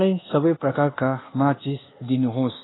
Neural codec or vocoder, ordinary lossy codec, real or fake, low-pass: codec, 24 kHz, 1.2 kbps, DualCodec; AAC, 16 kbps; fake; 7.2 kHz